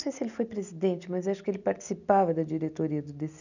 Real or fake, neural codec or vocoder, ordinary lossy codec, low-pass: real; none; none; 7.2 kHz